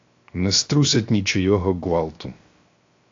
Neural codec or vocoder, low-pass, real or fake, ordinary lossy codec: codec, 16 kHz, 0.7 kbps, FocalCodec; 7.2 kHz; fake; AAC, 48 kbps